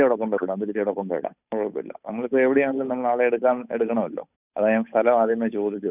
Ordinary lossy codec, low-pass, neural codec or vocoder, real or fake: none; 3.6 kHz; codec, 16 kHz, 8 kbps, FunCodec, trained on Chinese and English, 25 frames a second; fake